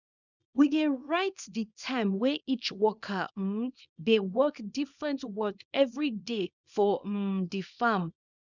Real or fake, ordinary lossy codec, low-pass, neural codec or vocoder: fake; none; 7.2 kHz; codec, 24 kHz, 0.9 kbps, WavTokenizer, small release